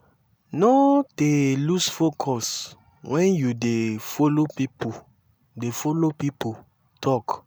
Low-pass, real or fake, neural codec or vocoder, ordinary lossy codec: none; real; none; none